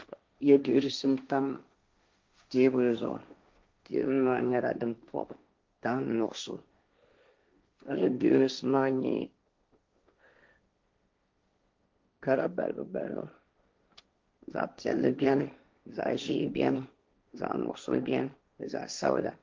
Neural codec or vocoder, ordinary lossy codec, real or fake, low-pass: codec, 16 kHz, 1.1 kbps, Voila-Tokenizer; Opus, 32 kbps; fake; 7.2 kHz